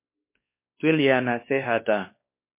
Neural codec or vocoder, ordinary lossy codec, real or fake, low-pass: codec, 16 kHz, 2 kbps, X-Codec, WavLM features, trained on Multilingual LibriSpeech; MP3, 24 kbps; fake; 3.6 kHz